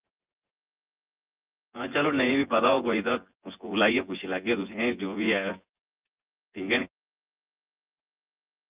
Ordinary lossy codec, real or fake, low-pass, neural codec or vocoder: Opus, 24 kbps; fake; 3.6 kHz; vocoder, 24 kHz, 100 mel bands, Vocos